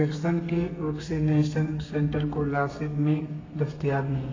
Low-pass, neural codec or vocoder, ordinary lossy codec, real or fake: 7.2 kHz; codec, 32 kHz, 1.9 kbps, SNAC; AAC, 32 kbps; fake